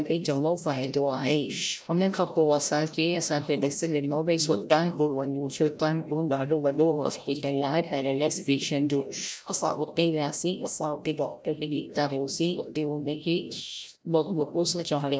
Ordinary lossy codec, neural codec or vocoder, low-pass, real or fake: none; codec, 16 kHz, 0.5 kbps, FreqCodec, larger model; none; fake